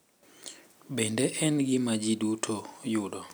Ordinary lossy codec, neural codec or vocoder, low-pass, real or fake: none; none; none; real